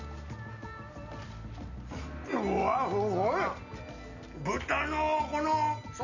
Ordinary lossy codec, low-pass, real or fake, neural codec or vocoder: none; 7.2 kHz; real; none